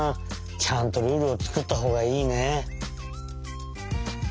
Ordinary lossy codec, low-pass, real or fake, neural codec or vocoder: none; none; real; none